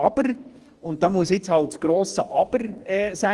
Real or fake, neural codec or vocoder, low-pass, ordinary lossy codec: fake; codec, 44.1 kHz, 2.6 kbps, DAC; 10.8 kHz; Opus, 24 kbps